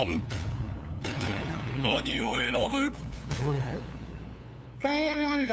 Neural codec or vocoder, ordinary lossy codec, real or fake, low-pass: codec, 16 kHz, 2 kbps, FunCodec, trained on LibriTTS, 25 frames a second; none; fake; none